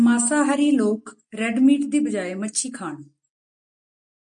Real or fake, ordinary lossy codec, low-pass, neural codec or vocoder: real; MP3, 64 kbps; 9.9 kHz; none